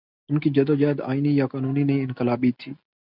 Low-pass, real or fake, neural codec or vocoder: 5.4 kHz; real; none